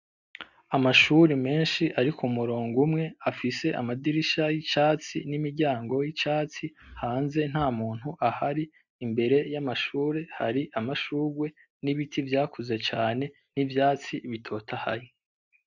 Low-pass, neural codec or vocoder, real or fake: 7.2 kHz; none; real